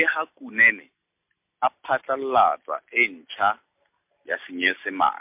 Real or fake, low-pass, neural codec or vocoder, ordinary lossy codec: real; 3.6 kHz; none; MP3, 32 kbps